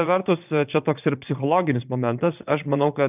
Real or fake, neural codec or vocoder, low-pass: fake; vocoder, 22.05 kHz, 80 mel bands, Vocos; 3.6 kHz